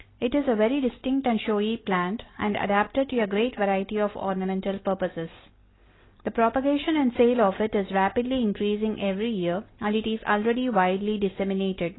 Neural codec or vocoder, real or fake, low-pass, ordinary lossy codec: none; real; 7.2 kHz; AAC, 16 kbps